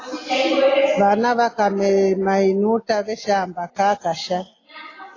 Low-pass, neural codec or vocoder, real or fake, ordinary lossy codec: 7.2 kHz; none; real; AAC, 32 kbps